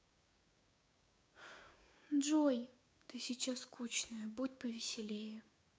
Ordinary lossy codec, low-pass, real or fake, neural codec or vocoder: none; none; fake; codec, 16 kHz, 6 kbps, DAC